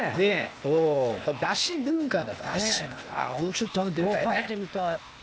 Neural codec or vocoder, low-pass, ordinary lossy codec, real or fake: codec, 16 kHz, 0.8 kbps, ZipCodec; none; none; fake